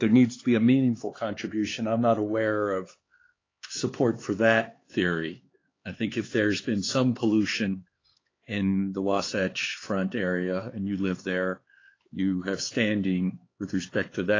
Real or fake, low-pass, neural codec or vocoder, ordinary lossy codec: fake; 7.2 kHz; codec, 16 kHz, 4 kbps, X-Codec, HuBERT features, trained on LibriSpeech; AAC, 32 kbps